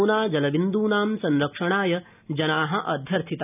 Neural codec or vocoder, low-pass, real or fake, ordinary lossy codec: none; 3.6 kHz; real; none